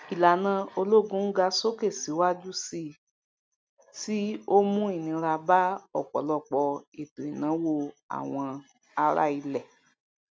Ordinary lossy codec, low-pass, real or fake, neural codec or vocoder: none; none; real; none